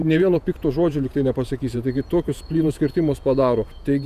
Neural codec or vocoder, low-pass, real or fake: vocoder, 48 kHz, 128 mel bands, Vocos; 14.4 kHz; fake